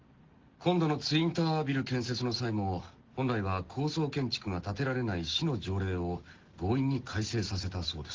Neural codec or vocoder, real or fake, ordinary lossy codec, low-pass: none; real; Opus, 16 kbps; 7.2 kHz